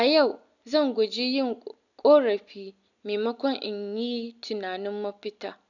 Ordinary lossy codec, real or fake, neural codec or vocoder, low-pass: none; real; none; 7.2 kHz